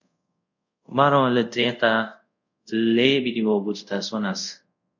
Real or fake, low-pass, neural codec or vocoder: fake; 7.2 kHz; codec, 24 kHz, 0.5 kbps, DualCodec